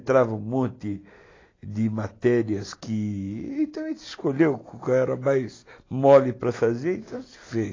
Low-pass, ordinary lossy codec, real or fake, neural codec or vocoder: 7.2 kHz; AAC, 32 kbps; real; none